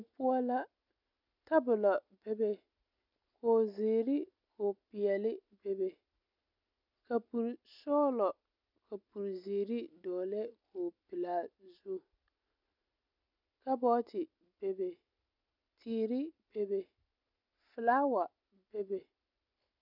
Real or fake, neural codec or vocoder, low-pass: real; none; 5.4 kHz